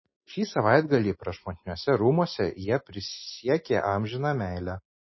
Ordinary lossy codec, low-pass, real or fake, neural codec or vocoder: MP3, 24 kbps; 7.2 kHz; real; none